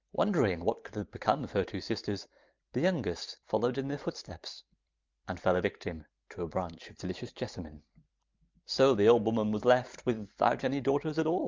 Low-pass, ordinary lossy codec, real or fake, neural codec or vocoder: 7.2 kHz; Opus, 24 kbps; fake; vocoder, 44.1 kHz, 128 mel bands every 512 samples, BigVGAN v2